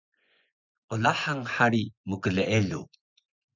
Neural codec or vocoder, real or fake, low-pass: vocoder, 24 kHz, 100 mel bands, Vocos; fake; 7.2 kHz